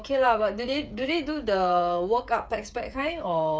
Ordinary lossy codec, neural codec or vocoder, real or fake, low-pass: none; codec, 16 kHz, 8 kbps, FreqCodec, smaller model; fake; none